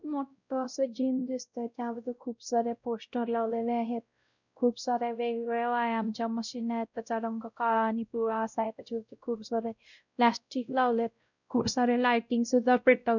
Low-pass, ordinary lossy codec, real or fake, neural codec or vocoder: 7.2 kHz; none; fake; codec, 16 kHz, 0.5 kbps, X-Codec, WavLM features, trained on Multilingual LibriSpeech